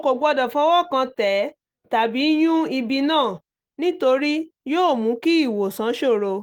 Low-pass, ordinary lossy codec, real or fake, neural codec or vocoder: 19.8 kHz; Opus, 24 kbps; real; none